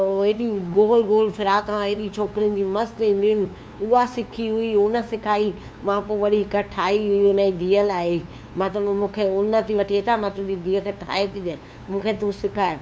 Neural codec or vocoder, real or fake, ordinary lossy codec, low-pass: codec, 16 kHz, 2 kbps, FunCodec, trained on LibriTTS, 25 frames a second; fake; none; none